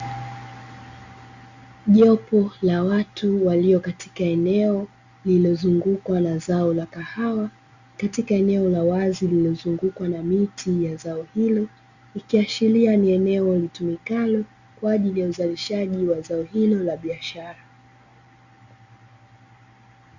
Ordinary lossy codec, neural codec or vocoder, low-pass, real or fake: Opus, 64 kbps; none; 7.2 kHz; real